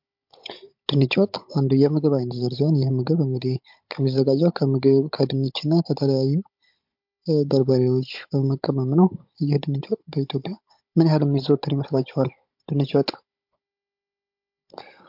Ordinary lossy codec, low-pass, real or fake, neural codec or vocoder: MP3, 48 kbps; 5.4 kHz; fake; codec, 16 kHz, 16 kbps, FunCodec, trained on Chinese and English, 50 frames a second